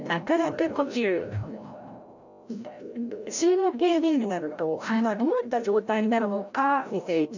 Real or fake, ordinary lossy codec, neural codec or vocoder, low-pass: fake; none; codec, 16 kHz, 0.5 kbps, FreqCodec, larger model; 7.2 kHz